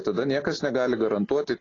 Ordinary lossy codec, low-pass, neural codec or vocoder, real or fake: AAC, 32 kbps; 7.2 kHz; none; real